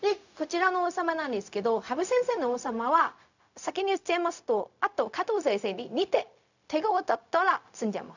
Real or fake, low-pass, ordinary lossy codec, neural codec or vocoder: fake; 7.2 kHz; none; codec, 16 kHz, 0.4 kbps, LongCat-Audio-Codec